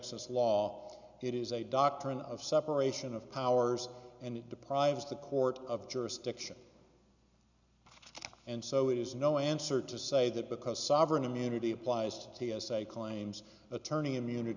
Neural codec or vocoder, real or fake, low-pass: none; real; 7.2 kHz